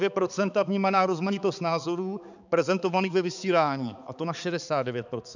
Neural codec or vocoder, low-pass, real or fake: codec, 16 kHz, 4 kbps, X-Codec, HuBERT features, trained on balanced general audio; 7.2 kHz; fake